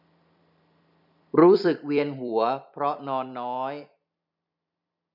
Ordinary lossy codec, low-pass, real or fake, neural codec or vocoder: none; 5.4 kHz; fake; vocoder, 44.1 kHz, 128 mel bands every 256 samples, BigVGAN v2